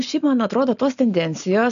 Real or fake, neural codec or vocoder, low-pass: real; none; 7.2 kHz